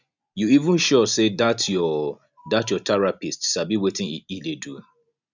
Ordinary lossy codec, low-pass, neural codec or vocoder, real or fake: none; 7.2 kHz; none; real